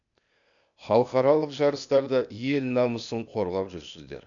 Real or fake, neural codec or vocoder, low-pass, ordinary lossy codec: fake; codec, 16 kHz, 0.8 kbps, ZipCodec; 7.2 kHz; MP3, 96 kbps